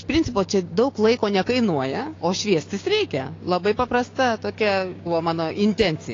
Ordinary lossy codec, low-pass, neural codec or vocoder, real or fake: AAC, 32 kbps; 7.2 kHz; codec, 16 kHz, 6 kbps, DAC; fake